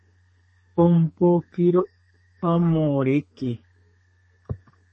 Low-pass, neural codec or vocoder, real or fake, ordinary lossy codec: 10.8 kHz; codec, 32 kHz, 1.9 kbps, SNAC; fake; MP3, 32 kbps